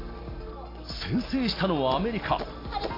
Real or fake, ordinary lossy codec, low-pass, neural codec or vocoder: real; AAC, 24 kbps; 5.4 kHz; none